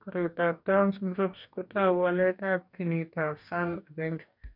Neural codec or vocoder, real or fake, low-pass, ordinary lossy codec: codec, 44.1 kHz, 2.6 kbps, DAC; fake; 5.4 kHz; none